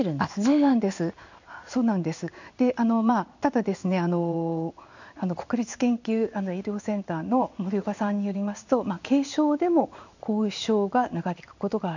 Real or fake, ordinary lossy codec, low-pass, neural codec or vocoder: fake; none; 7.2 kHz; codec, 16 kHz in and 24 kHz out, 1 kbps, XY-Tokenizer